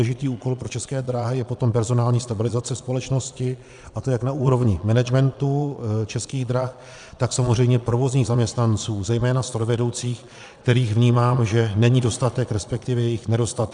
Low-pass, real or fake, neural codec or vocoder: 9.9 kHz; fake; vocoder, 22.05 kHz, 80 mel bands, Vocos